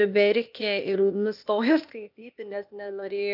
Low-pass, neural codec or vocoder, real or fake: 5.4 kHz; codec, 16 kHz, 0.8 kbps, ZipCodec; fake